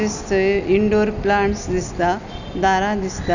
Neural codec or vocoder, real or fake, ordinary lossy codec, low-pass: none; real; none; 7.2 kHz